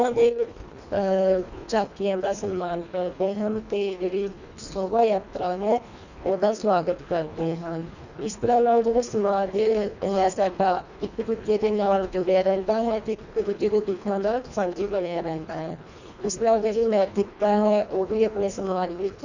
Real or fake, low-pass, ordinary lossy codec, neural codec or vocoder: fake; 7.2 kHz; none; codec, 24 kHz, 1.5 kbps, HILCodec